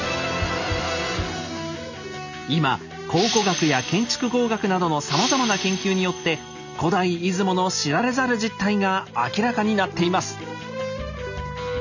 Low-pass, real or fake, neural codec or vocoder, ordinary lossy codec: 7.2 kHz; real; none; none